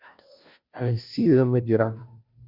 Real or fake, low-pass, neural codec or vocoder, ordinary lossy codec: fake; 5.4 kHz; codec, 16 kHz, 0.5 kbps, FunCodec, trained on Chinese and English, 25 frames a second; Opus, 64 kbps